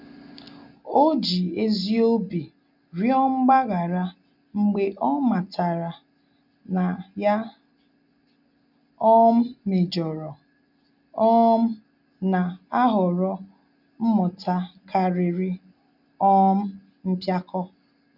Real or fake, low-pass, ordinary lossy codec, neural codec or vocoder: real; 5.4 kHz; none; none